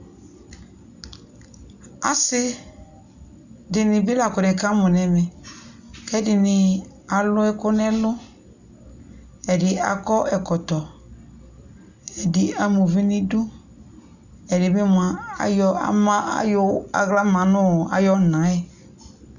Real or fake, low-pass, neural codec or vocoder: real; 7.2 kHz; none